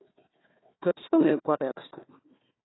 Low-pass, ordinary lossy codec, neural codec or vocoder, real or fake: 7.2 kHz; AAC, 16 kbps; codec, 16 kHz, 1 kbps, FunCodec, trained on Chinese and English, 50 frames a second; fake